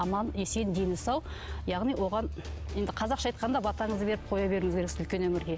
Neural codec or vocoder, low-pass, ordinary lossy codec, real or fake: none; none; none; real